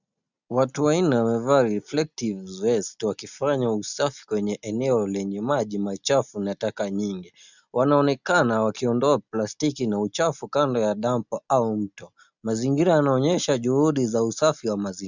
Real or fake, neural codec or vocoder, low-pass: real; none; 7.2 kHz